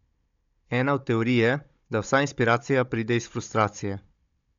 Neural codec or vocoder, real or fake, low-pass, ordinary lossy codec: codec, 16 kHz, 16 kbps, FunCodec, trained on Chinese and English, 50 frames a second; fake; 7.2 kHz; MP3, 64 kbps